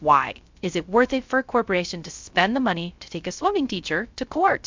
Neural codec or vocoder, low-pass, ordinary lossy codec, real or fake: codec, 16 kHz, 0.3 kbps, FocalCodec; 7.2 kHz; MP3, 64 kbps; fake